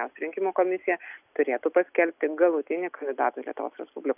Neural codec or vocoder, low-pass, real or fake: none; 3.6 kHz; real